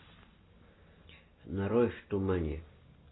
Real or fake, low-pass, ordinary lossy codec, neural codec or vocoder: real; 7.2 kHz; AAC, 16 kbps; none